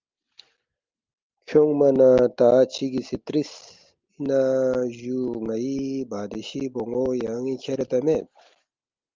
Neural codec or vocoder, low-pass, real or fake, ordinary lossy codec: none; 7.2 kHz; real; Opus, 32 kbps